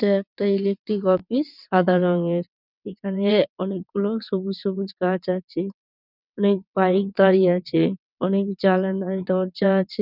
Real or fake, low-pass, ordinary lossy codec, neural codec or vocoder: fake; 5.4 kHz; none; vocoder, 22.05 kHz, 80 mel bands, WaveNeXt